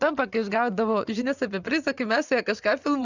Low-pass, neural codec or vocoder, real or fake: 7.2 kHz; vocoder, 24 kHz, 100 mel bands, Vocos; fake